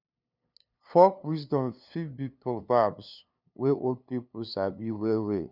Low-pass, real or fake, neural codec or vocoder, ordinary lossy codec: 5.4 kHz; fake; codec, 16 kHz, 2 kbps, FunCodec, trained on LibriTTS, 25 frames a second; none